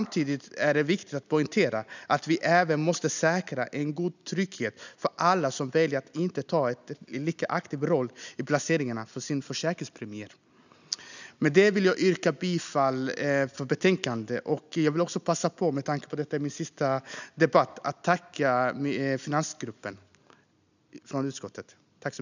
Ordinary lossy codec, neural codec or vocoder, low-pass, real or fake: none; none; 7.2 kHz; real